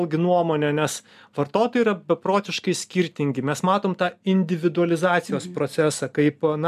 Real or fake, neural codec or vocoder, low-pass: real; none; 14.4 kHz